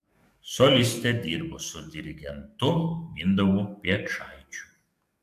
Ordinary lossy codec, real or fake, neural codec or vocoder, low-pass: AAC, 96 kbps; fake; codec, 44.1 kHz, 7.8 kbps, DAC; 14.4 kHz